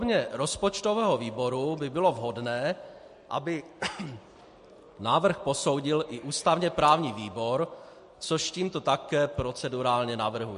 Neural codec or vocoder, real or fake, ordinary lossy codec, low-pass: none; real; MP3, 48 kbps; 14.4 kHz